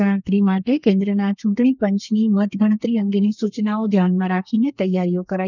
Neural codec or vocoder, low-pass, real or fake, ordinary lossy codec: codec, 32 kHz, 1.9 kbps, SNAC; 7.2 kHz; fake; none